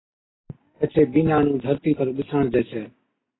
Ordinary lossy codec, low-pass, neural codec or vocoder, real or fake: AAC, 16 kbps; 7.2 kHz; none; real